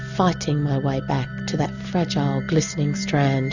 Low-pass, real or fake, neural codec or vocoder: 7.2 kHz; real; none